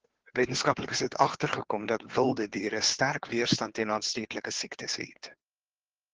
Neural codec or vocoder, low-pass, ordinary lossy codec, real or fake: codec, 16 kHz, 2 kbps, FunCodec, trained on Chinese and English, 25 frames a second; 7.2 kHz; Opus, 16 kbps; fake